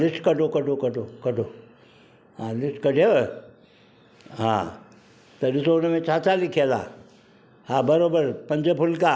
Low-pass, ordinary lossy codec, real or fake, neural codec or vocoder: none; none; real; none